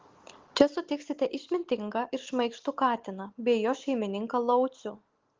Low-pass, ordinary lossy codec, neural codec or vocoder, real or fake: 7.2 kHz; Opus, 16 kbps; none; real